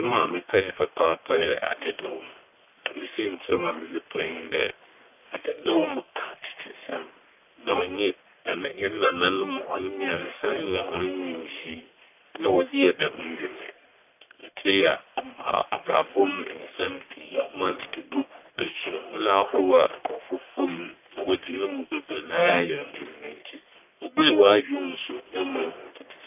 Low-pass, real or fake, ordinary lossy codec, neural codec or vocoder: 3.6 kHz; fake; none; codec, 44.1 kHz, 1.7 kbps, Pupu-Codec